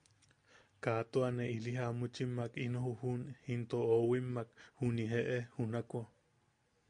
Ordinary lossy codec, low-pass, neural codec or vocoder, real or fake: MP3, 64 kbps; 9.9 kHz; none; real